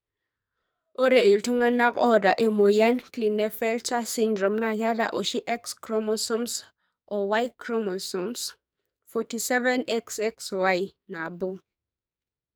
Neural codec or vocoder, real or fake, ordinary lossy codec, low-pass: codec, 44.1 kHz, 2.6 kbps, SNAC; fake; none; none